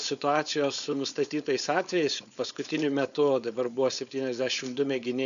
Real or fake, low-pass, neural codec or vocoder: fake; 7.2 kHz; codec, 16 kHz, 4.8 kbps, FACodec